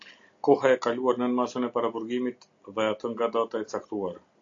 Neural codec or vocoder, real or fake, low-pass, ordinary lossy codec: none; real; 7.2 kHz; AAC, 48 kbps